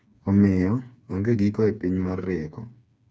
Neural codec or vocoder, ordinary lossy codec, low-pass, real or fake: codec, 16 kHz, 4 kbps, FreqCodec, smaller model; none; none; fake